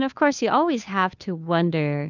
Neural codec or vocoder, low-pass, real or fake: codec, 16 kHz, 2 kbps, FunCodec, trained on Chinese and English, 25 frames a second; 7.2 kHz; fake